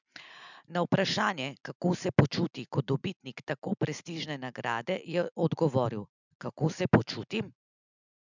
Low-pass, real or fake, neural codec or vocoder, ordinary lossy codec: 7.2 kHz; real; none; none